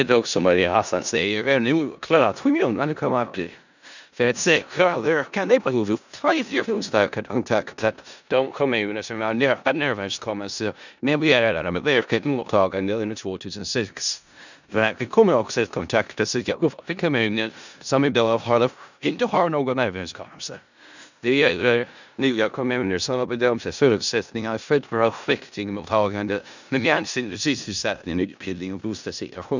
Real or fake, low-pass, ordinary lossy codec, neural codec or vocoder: fake; 7.2 kHz; none; codec, 16 kHz in and 24 kHz out, 0.4 kbps, LongCat-Audio-Codec, four codebook decoder